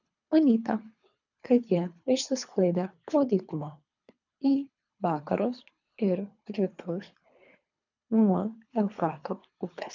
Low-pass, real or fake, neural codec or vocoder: 7.2 kHz; fake; codec, 24 kHz, 3 kbps, HILCodec